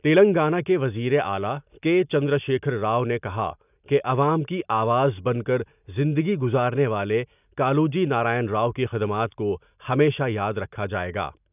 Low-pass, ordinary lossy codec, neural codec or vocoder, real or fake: 3.6 kHz; none; none; real